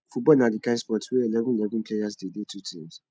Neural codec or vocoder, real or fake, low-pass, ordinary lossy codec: none; real; none; none